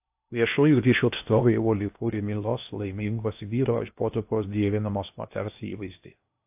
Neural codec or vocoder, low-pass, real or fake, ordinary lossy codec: codec, 16 kHz in and 24 kHz out, 0.6 kbps, FocalCodec, streaming, 4096 codes; 3.6 kHz; fake; AAC, 32 kbps